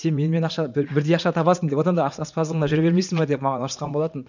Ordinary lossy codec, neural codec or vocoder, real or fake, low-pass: none; vocoder, 44.1 kHz, 80 mel bands, Vocos; fake; 7.2 kHz